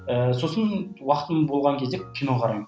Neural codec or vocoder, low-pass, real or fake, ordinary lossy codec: none; none; real; none